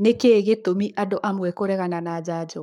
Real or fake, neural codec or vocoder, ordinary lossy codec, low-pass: fake; codec, 44.1 kHz, 7.8 kbps, Pupu-Codec; none; 19.8 kHz